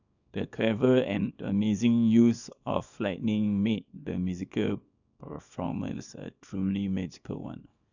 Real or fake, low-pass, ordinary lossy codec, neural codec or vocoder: fake; 7.2 kHz; none; codec, 24 kHz, 0.9 kbps, WavTokenizer, small release